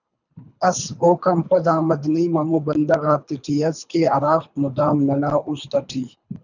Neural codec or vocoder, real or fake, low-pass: codec, 24 kHz, 3 kbps, HILCodec; fake; 7.2 kHz